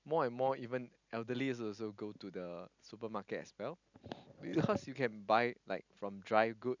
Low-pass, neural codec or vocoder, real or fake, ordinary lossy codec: 7.2 kHz; none; real; none